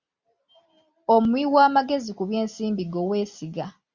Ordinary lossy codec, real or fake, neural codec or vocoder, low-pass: Opus, 64 kbps; real; none; 7.2 kHz